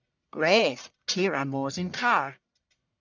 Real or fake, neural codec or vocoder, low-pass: fake; codec, 44.1 kHz, 1.7 kbps, Pupu-Codec; 7.2 kHz